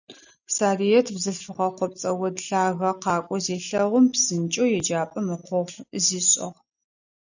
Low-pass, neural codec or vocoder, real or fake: 7.2 kHz; none; real